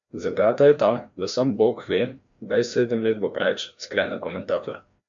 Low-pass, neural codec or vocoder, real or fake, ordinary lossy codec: 7.2 kHz; codec, 16 kHz, 1 kbps, FreqCodec, larger model; fake; MP3, 64 kbps